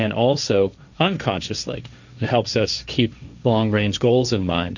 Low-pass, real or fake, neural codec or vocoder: 7.2 kHz; fake; codec, 16 kHz, 1.1 kbps, Voila-Tokenizer